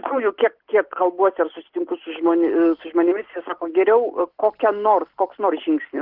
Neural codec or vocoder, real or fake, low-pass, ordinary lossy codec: none; real; 5.4 kHz; Opus, 16 kbps